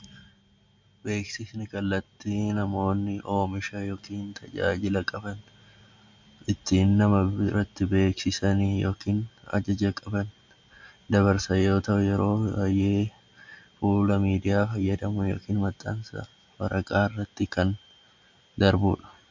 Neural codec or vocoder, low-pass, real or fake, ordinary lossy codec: none; 7.2 kHz; real; MP3, 64 kbps